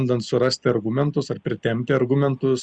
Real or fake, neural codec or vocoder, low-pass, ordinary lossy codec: real; none; 9.9 kHz; Opus, 64 kbps